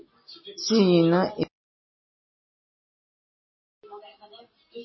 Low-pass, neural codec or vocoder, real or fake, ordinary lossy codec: 7.2 kHz; none; real; MP3, 24 kbps